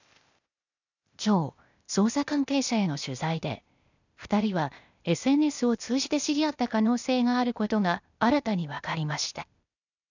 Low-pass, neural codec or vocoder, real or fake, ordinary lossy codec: 7.2 kHz; codec, 16 kHz, 0.8 kbps, ZipCodec; fake; none